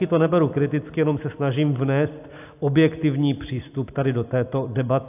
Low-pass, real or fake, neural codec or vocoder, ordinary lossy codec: 3.6 kHz; real; none; AAC, 32 kbps